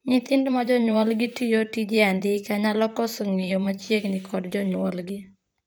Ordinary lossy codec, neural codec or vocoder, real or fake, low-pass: none; vocoder, 44.1 kHz, 128 mel bands, Pupu-Vocoder; fake; none